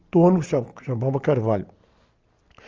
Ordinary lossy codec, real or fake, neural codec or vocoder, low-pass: Opus, 24 kbps; real; none; 7.2 kHz